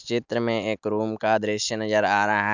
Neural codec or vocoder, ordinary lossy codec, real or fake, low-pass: none; none; real; 7.2 kHz